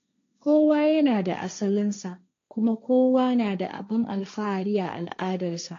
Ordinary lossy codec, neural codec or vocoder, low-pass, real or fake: AAC, 48 kbps; codec, 16 kHz, 1.1 kbps, Voila-Tokenizer; 7.2 kHz; fake